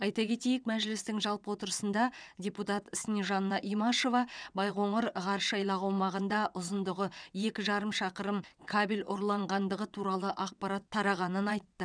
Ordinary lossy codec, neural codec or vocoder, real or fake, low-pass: none; vocoder, 22.05 kHz, 80 mel bands, WaveNeXt; fake; 9.9 kHz